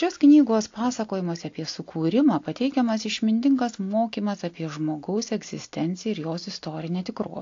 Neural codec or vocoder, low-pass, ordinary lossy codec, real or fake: none; 7.2 kHz; AAC, 48 kbps; real